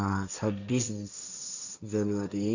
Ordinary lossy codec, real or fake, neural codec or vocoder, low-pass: none; fake; codec, 16 kHz, 1.1 kbps, Voila-Tokenizer; 7.2 kHz